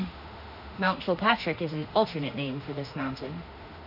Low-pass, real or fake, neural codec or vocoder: 5.4 kHz; fake; autoencoder, 48 kHz, 32 numbers a frame, DAC-VAE, trained on Japanese speech